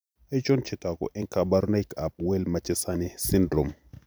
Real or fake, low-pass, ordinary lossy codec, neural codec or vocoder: fake; none; none; vocoder, 44.1 kHz, 128 mel bands every 256 samples, BigVGAN v2